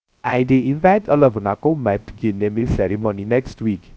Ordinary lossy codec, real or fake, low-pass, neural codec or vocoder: none; fake; none; codec, 16 kHz, 0.3 kbps, FocalCodec